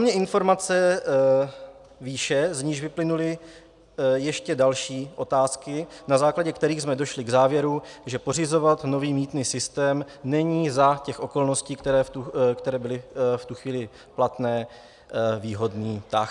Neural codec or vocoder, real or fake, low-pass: none; real; 10.8 kHz